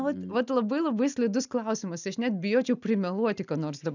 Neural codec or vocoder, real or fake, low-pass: none; real; 7.2 kHz